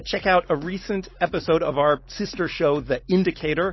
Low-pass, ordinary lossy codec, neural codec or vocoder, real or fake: 7.2 kHz; MP3, 24 kbps; none; real